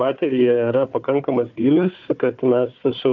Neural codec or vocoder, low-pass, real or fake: codec, 16 kHz, 4 kbps, FunCodec, trained on Chinese and English, 50 frames a second; 7.2 kHz; fake